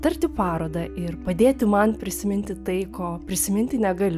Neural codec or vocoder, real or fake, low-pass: none; real; 14.4 kHz